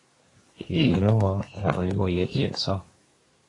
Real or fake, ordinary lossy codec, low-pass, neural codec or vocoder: fake; AAC, 32 kbps; 10.8 kHz; codec, 24 kHz, 1 kbps, SNAC